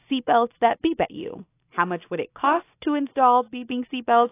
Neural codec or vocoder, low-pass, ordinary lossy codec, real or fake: none; 3.6 kHz; AAC, 24 kbps; real